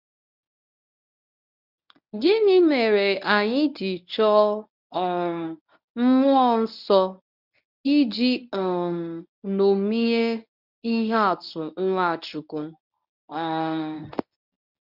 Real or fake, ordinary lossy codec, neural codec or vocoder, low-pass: fake; none; codec, 24 kHz, 0.9 kbps, WavTokenizer, medium speech release version 1; 5.4 kHz